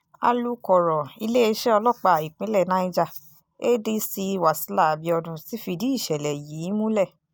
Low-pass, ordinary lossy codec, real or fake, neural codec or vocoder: none; none; real; none